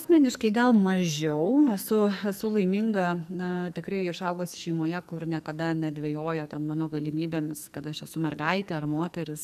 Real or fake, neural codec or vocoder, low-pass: fake; codec, 44.1 kHz, 2.6 kbps, SNAC; 14.4 kHz